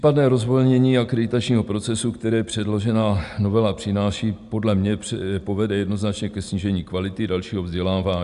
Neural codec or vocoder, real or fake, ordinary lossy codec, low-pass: none; real; AAC, 96 kbps; 10.8 kHz